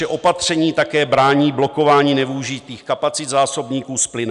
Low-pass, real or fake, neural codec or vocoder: 10.8 kHz; real; none